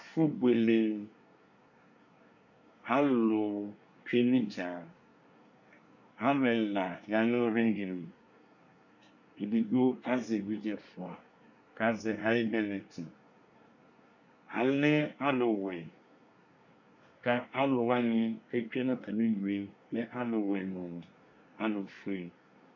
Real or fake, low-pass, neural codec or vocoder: fake; 7.2 kHz; codec, 24 kHz, 1 kbps, SNAC